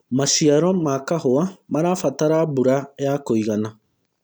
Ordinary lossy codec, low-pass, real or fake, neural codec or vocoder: none; none; real; none